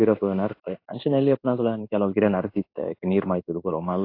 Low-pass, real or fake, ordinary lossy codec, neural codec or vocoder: 5.4 kHz; fake; AAC, 32 kbps; codec, 16 kHz in and 24 kHz out, 1 kbps, XY-Tokenizer